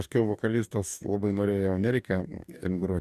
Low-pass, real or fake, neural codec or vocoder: 14.4 kHz; fake; codec, 44.1 kHz, 2.6 kbps, DAC